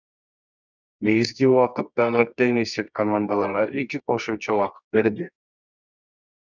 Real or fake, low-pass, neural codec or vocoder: fake; 7.2 kHz; codec, 24 kHz, 0.9 kbps, WavTokenizer, medium music audio release